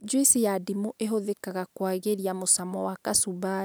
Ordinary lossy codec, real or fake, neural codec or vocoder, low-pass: none; real; none; none